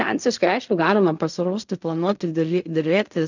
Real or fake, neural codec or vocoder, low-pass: fake; codec, 16 kHz in and 24 kHz out, 0.4 kbps, LongCat-Audio-Codec, fine tuned four codebook decoder; 7.2 kHz